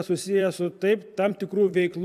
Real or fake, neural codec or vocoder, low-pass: fake; vocoder, 44.1 kHz, 128 mel bands every 256 samples, BigVGAN v2; 14.4 kHz